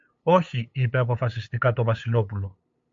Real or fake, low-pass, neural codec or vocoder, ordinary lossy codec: fake; 7.2 kHz; codec, 16 kHz, 8 kbps, FunCodec, trained on LibriTTS, 25 frames a second; MP3, 48 kbps